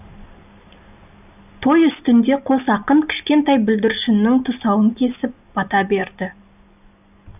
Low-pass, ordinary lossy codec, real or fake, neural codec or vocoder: 3.6 kHz; none; real; none